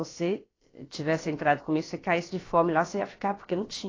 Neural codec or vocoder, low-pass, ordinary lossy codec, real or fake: codec, 16 kHz, about 1 kbps, DyCAST, with the encoder's durations; 7.2 kHz; AAC, 32 kbps; fake